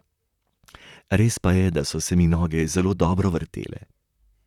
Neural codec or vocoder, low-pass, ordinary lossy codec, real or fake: vocoder, 44.1 kHz, 128 mel bands, Pupu-Vocoder; 19.8 kHz; none; fake